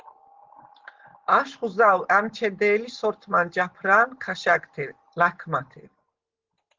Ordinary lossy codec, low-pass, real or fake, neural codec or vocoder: Opus, 16 kbps; 7.2 kHz; real; none